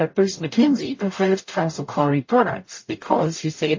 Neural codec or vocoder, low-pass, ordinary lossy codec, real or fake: codec, 44.1 kHz, 0.9 kbps, DAC; 7.2 kHz; MP3, 32 kbps; fake